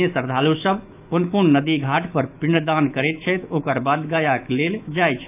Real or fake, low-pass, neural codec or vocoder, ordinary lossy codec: fake; 3.6 kHz; autoencoder, 48 kHz, 128 numbers a frame, DAC-VAE, trained on Japanese speech; none